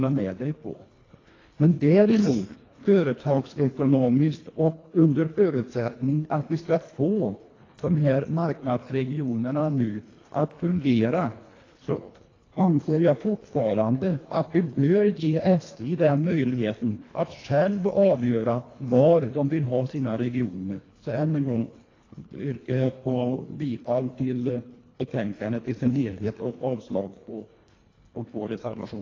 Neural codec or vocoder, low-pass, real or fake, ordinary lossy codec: codec, 24 kHz, 1.5 kbps, HILCodec; 7.2 kHz; fake; AAC, 32 kbps